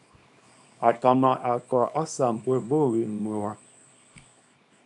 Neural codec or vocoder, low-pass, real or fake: codec, 24 kHz, 0.9 kbps, WavTokenizer, small release; 10.8 kHz; fake